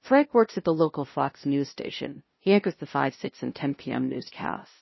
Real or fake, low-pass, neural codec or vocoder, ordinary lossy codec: fake; 7.2 kHz; codec, 16 kHz, 0.5 kbps, FunCodec, trained on LibriTTS, 25 frames a second; MP3, 24 kbps